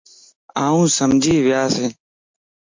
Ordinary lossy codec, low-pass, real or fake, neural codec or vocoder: MP3, 48 kbps; 7.2 kHz; real; none